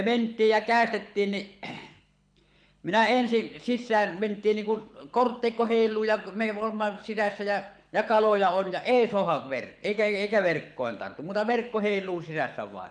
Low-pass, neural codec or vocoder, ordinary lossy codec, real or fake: 9.9 kHz; codec, 24 kHz, 6 kbps, HILCodec; none; fake